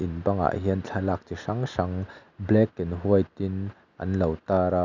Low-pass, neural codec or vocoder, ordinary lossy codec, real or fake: 7.2 kHz; none; none; real